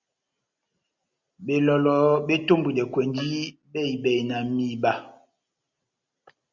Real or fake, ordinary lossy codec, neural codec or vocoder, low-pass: real; Opus, 64 kbps; none; 7.2 kHz